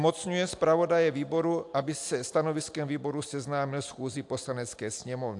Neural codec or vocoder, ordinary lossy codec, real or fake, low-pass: none; AAC, 64 kbps; real; 10.8 kHz